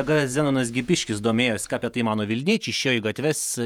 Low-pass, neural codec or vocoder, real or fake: 19.8 kHz; vocoder, 48 kHz, 128 mel bands, Vocos; fake